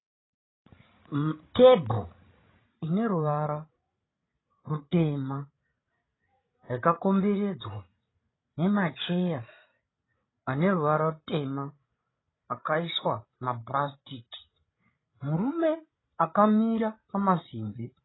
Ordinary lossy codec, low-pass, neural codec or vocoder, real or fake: AAC, 16 kbps; 7.2 kHz; codec, 16 kHz, 16 kbps, FreqCodec, larger model; fake